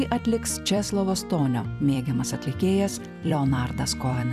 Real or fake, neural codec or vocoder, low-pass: fake; vocoder, 44.1 kHz, 128 mel bands every 256 samples, BigVGAN v2; 14.4 kHz